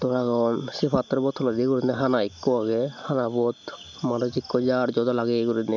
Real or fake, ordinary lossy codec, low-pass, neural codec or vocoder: real; none; 7.2 kHz; none